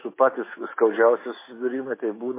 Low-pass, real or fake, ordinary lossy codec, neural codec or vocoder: 3.6 kHz; fake; MP3, 16 kbps; autoencoder, 48 kHz, 128 numbers a frame, DAC-VAE, trained on Japanese speech